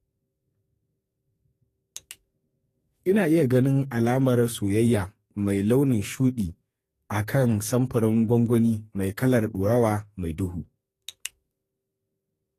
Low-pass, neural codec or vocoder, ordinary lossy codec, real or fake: 14.4 kHz; codec, 44.1 kHz, 2.6 kbps, SNAC; AAC, 48 kbps; fake